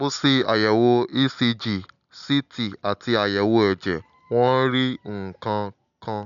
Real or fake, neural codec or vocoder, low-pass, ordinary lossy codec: real; none; 7.2 kHz; none